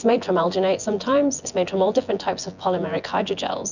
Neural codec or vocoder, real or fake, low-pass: vocoder, 24 kHz, 100 mel bands, Vocos; fake; 7.2 kHz